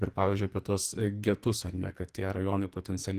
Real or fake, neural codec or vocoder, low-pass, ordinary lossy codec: fake; codec, 44.1 kHz, 2.6 kbps, SNAC; 14.4 kHz; Opus, 24 kbps